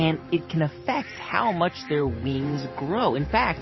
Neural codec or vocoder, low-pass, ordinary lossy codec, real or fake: vocoder, 44.1 kHz, 128 mel bands every 512 samples, BigVGAN v2; 7.2 kHz; MP3, 24 kbps; fake